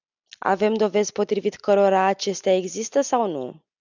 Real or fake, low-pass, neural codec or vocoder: real; 7.2 kHz; none